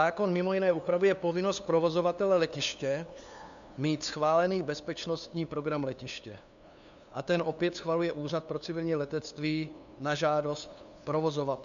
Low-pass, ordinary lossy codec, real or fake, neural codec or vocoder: 7.2 kHz; AAC, 96 kbps; fake; codec, 16 kHz, 2 kbps, FunCodec, trained on LibriTTS, 25 frames a second